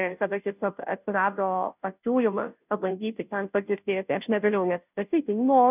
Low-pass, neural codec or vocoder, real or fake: 3.6 kHz; codec, 16 kHz, 0.5 kbps, FunCodec, trained on Chinese and English, 25 frames a second; fake